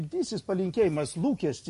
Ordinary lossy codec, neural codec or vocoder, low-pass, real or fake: MP3, 48 kbps; none; 14.4 kHz; real